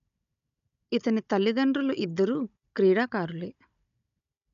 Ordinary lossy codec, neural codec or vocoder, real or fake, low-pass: none; codec, 16 kHz, 16 kbps, FunCodec, trained on Chinese and English, 50 frames a second; fake; 7.2 kHz